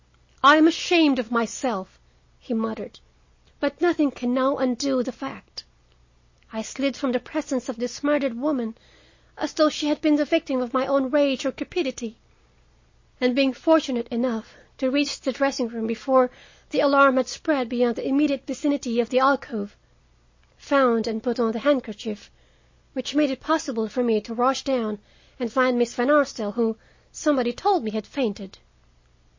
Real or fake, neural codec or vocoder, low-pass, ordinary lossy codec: real; none; 7.2 kHz; MP3, 32 kbps